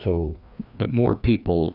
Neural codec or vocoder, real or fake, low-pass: codec, 16 kHz, 2 kbps, FreqCodec, larger model; fake; 5.4 kHz